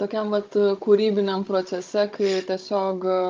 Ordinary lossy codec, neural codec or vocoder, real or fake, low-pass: Opus, 24 kbps; codec, 16 kHz, 16 kbps, FunCodec, trained on Chinese and English, 50 frames a second; fake; 7.2 kHz